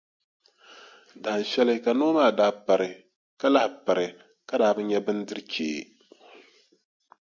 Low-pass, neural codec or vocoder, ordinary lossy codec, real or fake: 7.2 kHz; none; MP3, 64 kbps; real